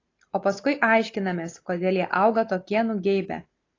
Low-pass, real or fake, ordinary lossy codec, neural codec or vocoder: 7.2 kHz; real; AAC, 32 kbps; none